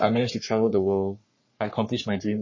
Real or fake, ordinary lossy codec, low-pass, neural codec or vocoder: fake; MP3, 32 kbps; 7.2 kHz; codec, 44.1 kHz, 3.4 kbps, Pupu-Codec